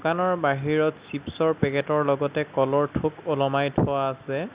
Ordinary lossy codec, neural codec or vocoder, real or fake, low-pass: Opus, 64 kbps; none; real; 3.6 kHz